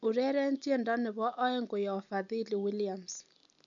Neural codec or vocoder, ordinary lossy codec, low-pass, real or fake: none; none; 7.2 kHz; real